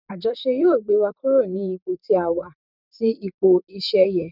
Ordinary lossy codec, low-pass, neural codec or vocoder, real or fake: none; 5.4 kHz; vocoder, 44.1 kHz, 128 mel bands, Pupu-Vocoder; fake